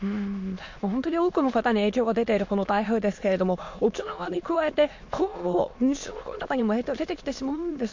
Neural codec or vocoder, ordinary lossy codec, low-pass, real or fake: autoencoder, 22.05 kHz, a latent of 192 numbers a frame, VITS, trained on many speakers; MP3, 48 kbps; 7.2 kHz; fake